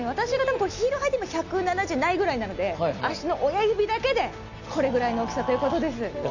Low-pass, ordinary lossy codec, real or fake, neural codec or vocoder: 7.2 kHz; none; real; none